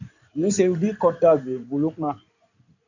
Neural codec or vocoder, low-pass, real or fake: codec, 16 kHz in and 24 kHz out, 2.2 kbps, FireRedTTS-2 codec; 7.2 kHz; fake